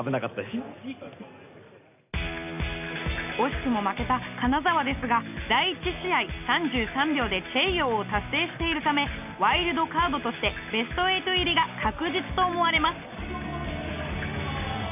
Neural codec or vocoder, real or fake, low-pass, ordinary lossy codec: none; real; 3.6 kHz; none